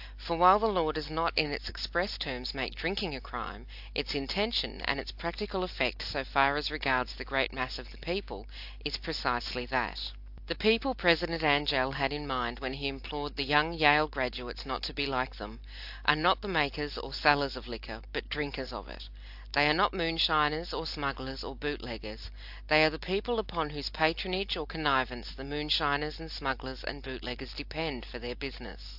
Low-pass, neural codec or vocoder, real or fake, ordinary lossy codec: 5.4 kHz; none; real; AAC, 48 kbps